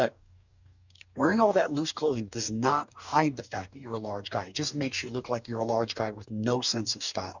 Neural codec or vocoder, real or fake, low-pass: codec, 44.1 kHz, 2.6 kbps, DAC; fake; 7.2 kHz